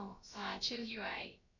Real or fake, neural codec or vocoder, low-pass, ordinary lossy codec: fake; codec, 16 kHz, about 1 kbps, DyCAST, with the encoder's durations; 7.2 kHz; none